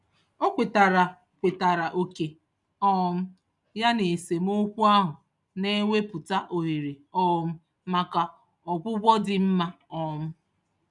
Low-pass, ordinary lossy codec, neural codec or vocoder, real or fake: 10.8 kHz; none; none; real